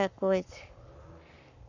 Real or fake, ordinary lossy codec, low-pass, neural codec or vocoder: fake; none; 7.2 kHz; codec, 44.1 kHz, 7.8 kbps, Pupu-Codec